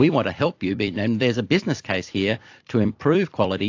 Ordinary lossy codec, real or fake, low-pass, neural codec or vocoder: AAC, 48 kbps; fake; 7.2 kHz; vocoder, 44.1 kHz, 128 mel bands every 256 samples, BigVGAN v2